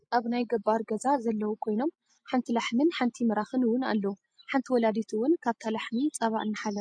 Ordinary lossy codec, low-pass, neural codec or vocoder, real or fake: MP3, 48 kbps; 9.9 kHz; none; real